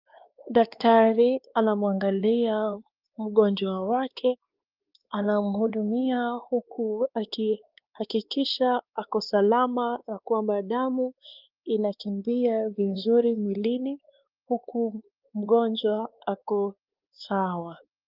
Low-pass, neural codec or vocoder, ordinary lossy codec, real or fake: 5.4 kHz; codec, 16 kHz, 2 kbps, X-Codec, WavLM features, trained on Multilingual LibriSpeech; Opus, 32 kbps; fake